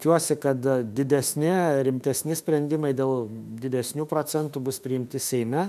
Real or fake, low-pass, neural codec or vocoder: fake; 14.4 kHz; autoencoder, 48 kHz, 32 numbers a frame, DAC-VAE, trained on Japanese speech